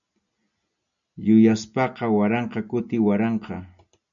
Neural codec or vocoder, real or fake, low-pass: none; real; 7.2 kHz